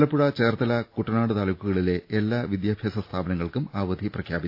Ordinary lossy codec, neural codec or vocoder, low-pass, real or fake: AAC, 32 kbps; none; 5.4 kHz; real